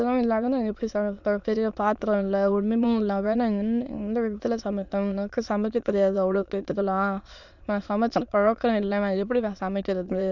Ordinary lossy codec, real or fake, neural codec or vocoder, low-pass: none; fake; autoencoder, 22.05 kHz, a latent of 192 numbers a frame, VITS, trained on many speakers; 7.2 kHz